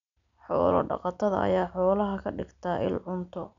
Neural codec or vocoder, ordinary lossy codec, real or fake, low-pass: none; none; real; 7.2 kHz